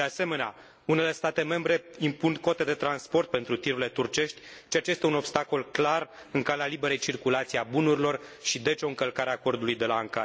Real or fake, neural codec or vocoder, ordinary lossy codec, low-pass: real; none; none; none